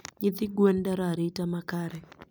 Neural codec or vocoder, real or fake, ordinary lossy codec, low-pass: none; real; none; none